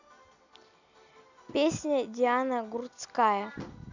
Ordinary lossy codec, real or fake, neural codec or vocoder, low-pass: none; real; none; 7.2 kHz